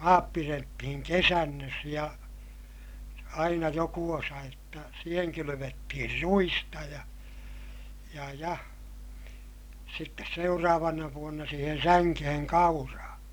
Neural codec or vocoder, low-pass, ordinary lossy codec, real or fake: none; none; none; real